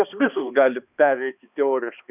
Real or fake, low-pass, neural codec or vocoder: fake; 3.6 kHz; codec, 16 kHz, 2 kbps, X-Codec, HuBERT features, trained on balanced general audio